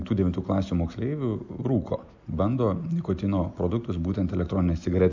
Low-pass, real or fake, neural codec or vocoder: 7.2 kHz; real; none